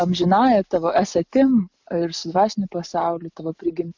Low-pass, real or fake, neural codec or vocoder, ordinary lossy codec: 7.2 kHz; real; none; MP3, 64 kbps